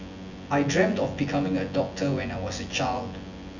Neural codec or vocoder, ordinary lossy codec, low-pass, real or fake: vocoder, 24 kHz, 100 mel bands, Vocos; none; 7.2 kHz; fake